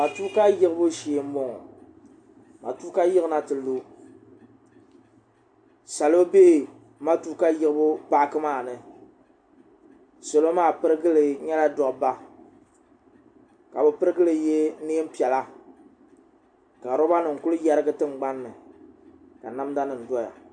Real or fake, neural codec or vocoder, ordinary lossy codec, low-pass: real; none; AAC, 64 kbps; 9.9 kHz